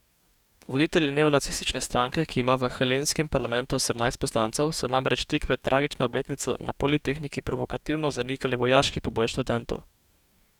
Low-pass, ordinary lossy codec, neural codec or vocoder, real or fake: 19.8 kHz; none; codec, 44.1 kHz, 2.6 kbps, DAC; fake